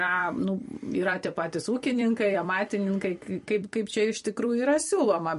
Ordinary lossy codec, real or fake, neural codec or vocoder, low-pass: MP3, 48 kbps; fake; vocoder, 44.1 kHz, 128 mel bands every 256 samples, BigVGAN v2; 14.4 kHz